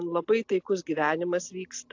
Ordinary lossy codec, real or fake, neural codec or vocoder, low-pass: AAC, 48 kbps; real; none; 7.2 kHz